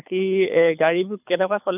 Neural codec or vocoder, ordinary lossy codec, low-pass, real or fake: codec, 24 kHz, 6 kbps, HILCodec; none; 3.6 kHz; fake